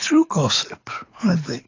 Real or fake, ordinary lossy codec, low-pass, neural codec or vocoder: fake; AAC, 32 kbps; 7.2 kHz; codec, 24 kHz, 6 kbps, HILCodec